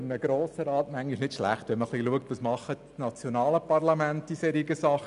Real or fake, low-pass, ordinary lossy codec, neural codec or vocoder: real; 10.8 kHz; none; none